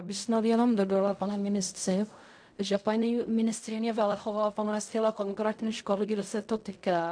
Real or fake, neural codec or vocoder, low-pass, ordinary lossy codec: fake; codec, 16 kHz in and 24 kHz out, 0.4 kbps, LongCat-Audio-Codec, fine tuned four codebook decoder; 9.9 kHz; MP3, 64 kbps